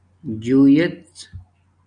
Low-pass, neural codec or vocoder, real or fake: 9.9 kHz; none; real